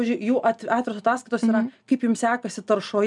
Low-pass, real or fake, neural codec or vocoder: 10.8 kHz; real; none